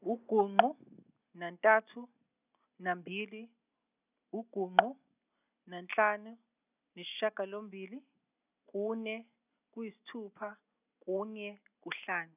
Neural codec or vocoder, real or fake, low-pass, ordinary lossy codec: none; real; 3.6 kHz; none